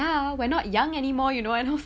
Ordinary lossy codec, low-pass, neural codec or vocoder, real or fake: none; none; none; real